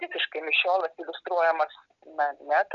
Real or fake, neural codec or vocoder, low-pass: real; none; 7.2 kHz